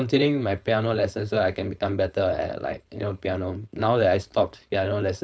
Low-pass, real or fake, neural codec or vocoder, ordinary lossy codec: none; fake; codec, 16 kHz, 4.8 kbps, FACodec; none